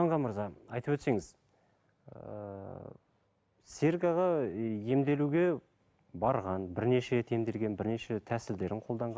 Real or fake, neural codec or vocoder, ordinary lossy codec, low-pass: real; none; none; none